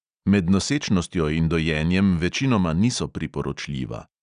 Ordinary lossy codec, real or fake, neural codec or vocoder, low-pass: none; real; none; 9.9 kHz